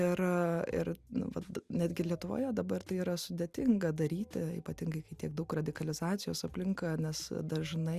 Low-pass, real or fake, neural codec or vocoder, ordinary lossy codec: 14.4 kHz; real; none; Opus, 64 kbps